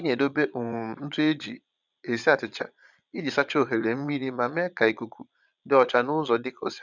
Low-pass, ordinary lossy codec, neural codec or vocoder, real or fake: 7.2 kHz; none; vocoder, 22.05 kHz, 80 mel bands, Vocos; fake